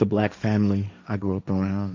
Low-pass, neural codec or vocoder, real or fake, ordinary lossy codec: 7.2 kHz; codec, 16 kHz, 1.1 kbps, Voila-Tokenizer; fake; Opus, 64 kbps